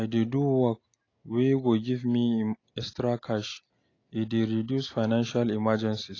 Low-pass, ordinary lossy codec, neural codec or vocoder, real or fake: 7.2 kHz; AAC, 32 kbps; none; real